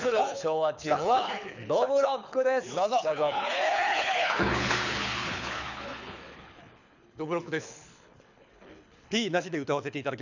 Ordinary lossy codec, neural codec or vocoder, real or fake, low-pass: none; codec, 24 kHz, 6 kbps, HILCodec; fake; 7.2 kHz